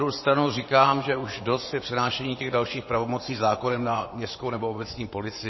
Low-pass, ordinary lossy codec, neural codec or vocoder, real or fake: 7.2 kHz; MP3, 24 kbps; vocoder, 24 kHz, 100 mel bands, Vocos; fake